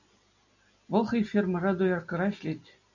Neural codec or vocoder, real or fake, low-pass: none; real; 7.2 kHz